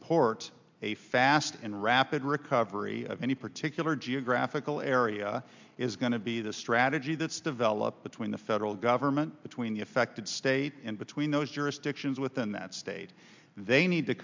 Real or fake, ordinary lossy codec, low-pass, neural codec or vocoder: real; MP3, 64 kbps; 7.2 kHz; none